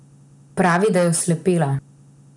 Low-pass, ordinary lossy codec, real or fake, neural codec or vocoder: 10.8 kHz; none; real; none